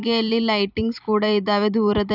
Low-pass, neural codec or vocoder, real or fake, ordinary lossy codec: 5.4 kHz; vocoder, 44.1 kHz, 128 mel bands every 256 samples, BigVGAN v2; fake; none